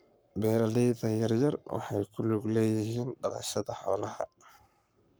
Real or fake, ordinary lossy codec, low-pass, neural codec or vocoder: fake; none; none; codec, 44.1 kHz, 7.8 kbps, Pupu-Codec